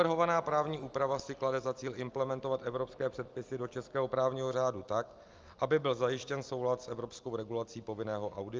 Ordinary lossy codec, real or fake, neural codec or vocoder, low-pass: Opus, 24 kbps; real; none; 7.2 kHz